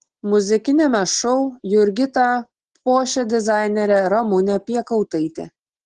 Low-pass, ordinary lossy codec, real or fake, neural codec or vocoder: 10.8 kHz; Opus, 16 kbps; real; none